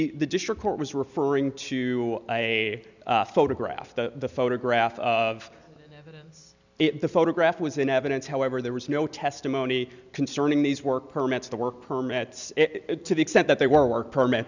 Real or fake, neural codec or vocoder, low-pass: real; none; 7.2 kHz